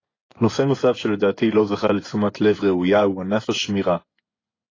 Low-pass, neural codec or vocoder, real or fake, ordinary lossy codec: 7.2 kHz; vocoder, 24 kHz, 100 mel bands, Vocos; fake; AAC, 32 kbps